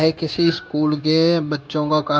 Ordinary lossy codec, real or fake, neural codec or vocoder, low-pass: none; fake; codec, 16 kHz, 0.9 kbps, LongCat-Audio-Codec; none